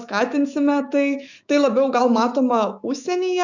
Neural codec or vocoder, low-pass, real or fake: autoencoder, 48 kHz, 128 numbers a frame, DAC-VAE, trained on Japanese speech; 7.2 kHz; fake